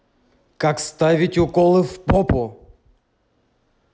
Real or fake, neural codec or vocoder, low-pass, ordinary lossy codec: real; none; none; none